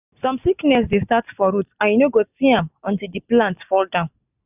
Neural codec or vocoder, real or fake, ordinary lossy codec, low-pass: none; real; none; 3.6 kHz